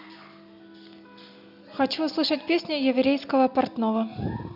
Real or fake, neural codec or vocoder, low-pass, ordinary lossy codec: real; none; 5.4 kHz; none